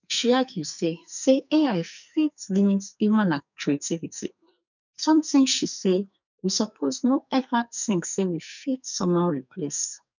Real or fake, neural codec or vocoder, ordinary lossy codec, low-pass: fake; codec, 44.1 kHz, 2.6 kbps, SNAC; none; 7.2 kHz